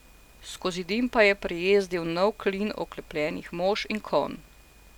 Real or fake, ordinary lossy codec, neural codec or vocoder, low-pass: real; none; none; 19.8 kHz